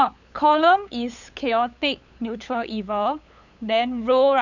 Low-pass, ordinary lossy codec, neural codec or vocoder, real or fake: 7.2 kHz; none; codec, 16 kHz, 8 kbps, FunCodec, trained on LibriTTS, 25 frames a second; fake